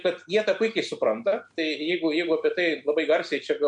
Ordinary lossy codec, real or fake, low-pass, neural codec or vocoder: MP3, 64 kbps; real; 9.9 kHz; none